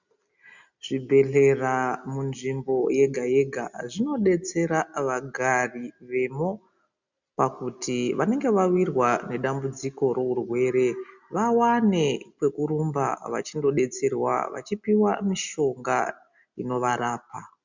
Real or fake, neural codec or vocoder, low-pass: real; none; 7.2 kHz